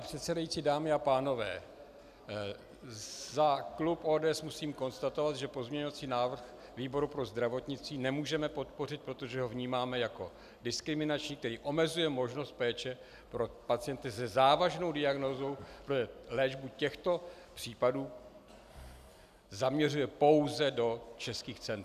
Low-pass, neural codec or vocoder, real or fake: 14.4 kHz; none; real